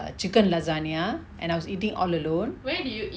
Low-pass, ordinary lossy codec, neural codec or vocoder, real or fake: none; none; none; real